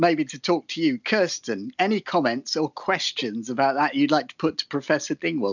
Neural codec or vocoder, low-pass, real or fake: none; 7.2 kHz; real